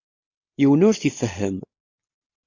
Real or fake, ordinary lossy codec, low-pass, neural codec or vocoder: real; AAC, 48 kbps; 7.2 kHz; none